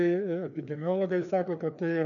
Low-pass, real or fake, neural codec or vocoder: 7.2 kHz; fake; codec, 16 kHz, 2 kbps, FreqCodec, larger model